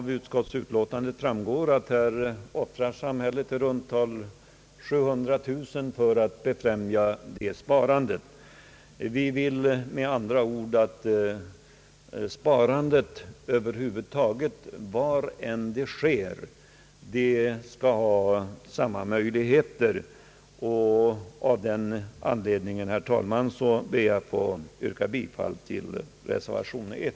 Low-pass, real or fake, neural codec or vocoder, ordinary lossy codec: none; real; none; none